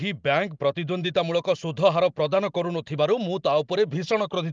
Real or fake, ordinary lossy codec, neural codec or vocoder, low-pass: real; Opus, 32 kbps; none; 7.2 kHz